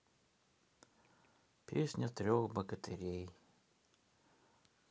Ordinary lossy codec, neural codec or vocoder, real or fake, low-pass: none; none; real; none